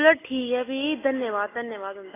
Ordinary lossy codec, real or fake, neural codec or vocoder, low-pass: AAC, 16 kbps; real; none; 3.6 kHz